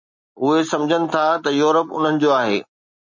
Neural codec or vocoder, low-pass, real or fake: none; 7.2 kHz; real